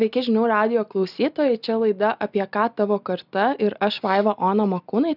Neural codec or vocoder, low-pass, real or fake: none; 5.4 kHz; real